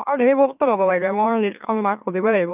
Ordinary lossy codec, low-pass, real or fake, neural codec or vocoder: none; 3.6 kHz; fake; autoencoder, 44.1 kHz, a latent of 192 numbers a frame, MeloTTS